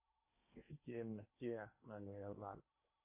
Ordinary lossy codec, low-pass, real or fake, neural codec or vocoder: MP3, 24 kbps; 3.6 kHz; fake; codec, 16 kHz in and 24 kHz out, 0.8 kbps, FocalCodec, streaming, 65536 codes